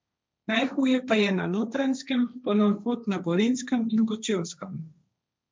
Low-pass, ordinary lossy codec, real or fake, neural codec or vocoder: none; none; fake; codec, 16 kHz, 1.1 kbps, Voila-Tokenizer